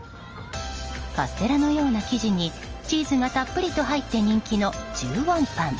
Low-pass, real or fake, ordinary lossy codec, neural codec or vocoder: 7.2 kHz; real; Opus, 24 kbps; none